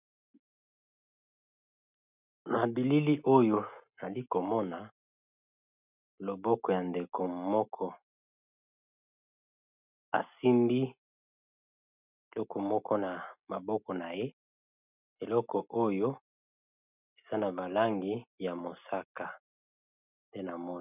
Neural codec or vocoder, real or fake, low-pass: none; real; 3.6 kHz